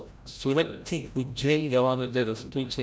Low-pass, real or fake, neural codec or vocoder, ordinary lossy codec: none; fake; codec, 16 kHz, 0.5 kbps, FreqCodec, larger model; none